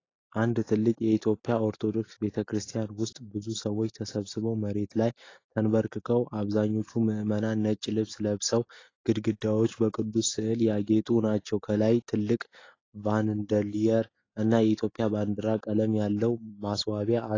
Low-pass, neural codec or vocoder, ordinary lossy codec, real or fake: 7.2 kHz; none; AAC, 32 kbps; real